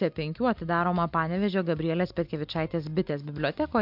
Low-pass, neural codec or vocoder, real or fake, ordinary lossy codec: 5.4 kHz; none; real; MP3, 48 kbps